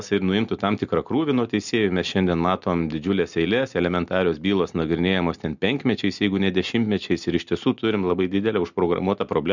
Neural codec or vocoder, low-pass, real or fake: none; 7.2 kHz; real